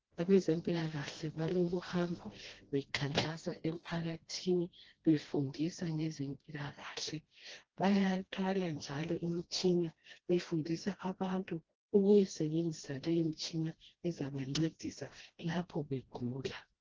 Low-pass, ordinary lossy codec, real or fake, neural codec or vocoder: 7.2 kHz; Opus, 16 kbps; fake; codec, 16 kHz, 1 kbps, FreqCodec, smaller model